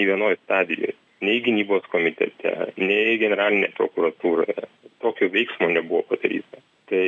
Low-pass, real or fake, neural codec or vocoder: 9.9 kHz; real; none